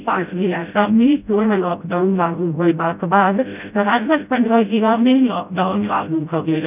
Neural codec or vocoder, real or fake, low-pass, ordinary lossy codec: codec, 16 kHz, 0.5 kbps, FreqCodec, smaller model; fake; 3.6 kHz; none